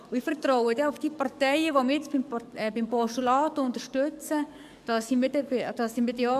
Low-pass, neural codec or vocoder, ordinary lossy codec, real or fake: 14.4 kHz; codec, 44.1 kHz, 7.8 kbps, DAC; MP3, 96 kbps; fake